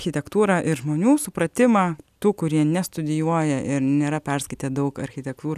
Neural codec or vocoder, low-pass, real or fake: none; 14.4 kHz; real